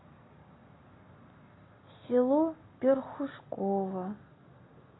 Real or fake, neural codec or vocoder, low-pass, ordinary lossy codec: real; none; 7.2 kHz; AAC, 16 kbps